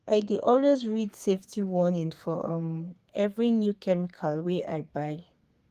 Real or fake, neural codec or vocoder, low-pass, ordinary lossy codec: fake; codec, 32 kHz, 1.9 kbps, SNAC; 14.4 kHz; Opus, 24 kbps